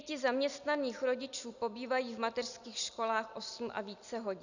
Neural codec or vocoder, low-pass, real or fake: none; 7.2 kHz; real